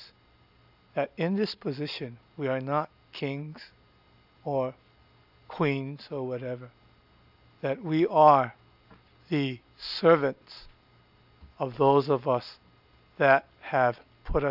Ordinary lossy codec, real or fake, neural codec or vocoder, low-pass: AAC, 48 kbps; real; none; 5.4 kHz